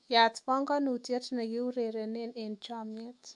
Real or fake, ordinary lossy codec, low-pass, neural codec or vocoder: fake; MP3, 64 kbps; 10.8 kHz; autoencoder, 48 kHz, 128 numbers a frame, DAC-VAE, trained on Japanese speech